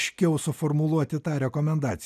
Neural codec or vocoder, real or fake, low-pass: none; real; 14.4 kHz